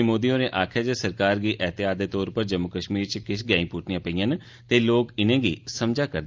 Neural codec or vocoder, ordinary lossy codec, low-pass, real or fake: none; Opus, 24 kbps; 7.2 kHz; real